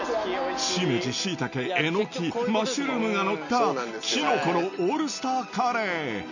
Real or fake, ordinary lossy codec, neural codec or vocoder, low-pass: real; none; none; 7.2 kHz